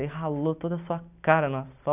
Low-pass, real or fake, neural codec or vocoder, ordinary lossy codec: 3.6 kHz; real; none; none